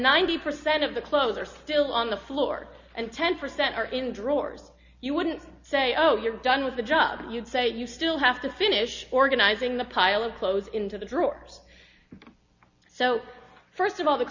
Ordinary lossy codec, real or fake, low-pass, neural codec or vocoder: Opus, 64 kbps; real; 7.2 kHz; none